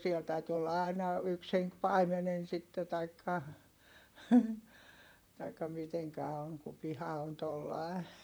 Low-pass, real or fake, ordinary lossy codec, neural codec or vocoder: none; fake; none; vocoder, 44.1 kHz, 128 mel bands, Pupu-Vocoder